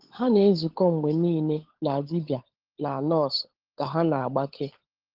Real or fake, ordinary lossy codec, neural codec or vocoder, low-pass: fake; Opus, 16 kbps; codec, 16 kHz, 16 kbps, FunCodec, trained on LibriTTS, 50 frames a second; 5.4 kHz